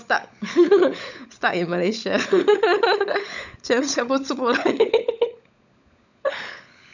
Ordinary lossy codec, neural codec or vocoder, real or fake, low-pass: none; codec, 16 kHz, 16 kbps, FunCodec, trained on LibriTTS, 50 frames a second; fake; 7.2 kHz